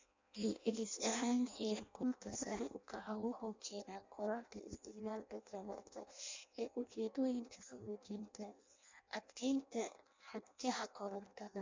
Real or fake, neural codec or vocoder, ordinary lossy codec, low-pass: fake; codec, 16 kHz in and 24 kHz out, 0.6 kbps, FireRedTTS-2 codec; MP3, 64 kbps; 7.2 kHz